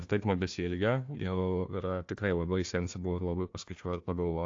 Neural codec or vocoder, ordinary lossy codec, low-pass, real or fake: codec, 16 kHz, 1 kbps, FunCodec, trained on Chinese and English, 50 frames a second; AAC, 48 kbps; 7.2 kHz; fake